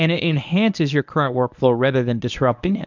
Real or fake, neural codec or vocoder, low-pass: fake; codec, 24 kHz, 0.9 kbps, WavTokenizer, medium speech release version 1; 7.2 kHz